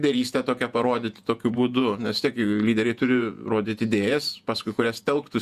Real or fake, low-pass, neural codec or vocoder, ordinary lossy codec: fake; 14.4 kHz; vocoder, 48 kHz, 128 mel bands, Vocos; Opus, 64 kbps